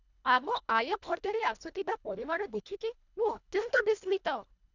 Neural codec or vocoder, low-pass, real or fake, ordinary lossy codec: codec, 24 kHz, 1.5 kbps, HILCodec; 7.2 kHz; fake; none